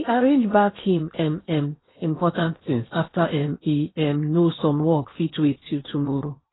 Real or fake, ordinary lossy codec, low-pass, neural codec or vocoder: fake; AAC, 16 kbps; 7.2 kHz; codec, 16 kHz in and 24 kHz out, 0.8 kbps, FocalCodec, streaming, 65536 codes